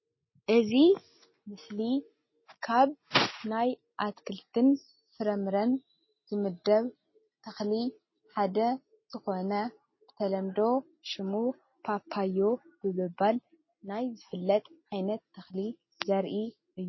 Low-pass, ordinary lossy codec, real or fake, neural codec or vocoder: 7.2 kHz; MP3, 24 kbps; real; none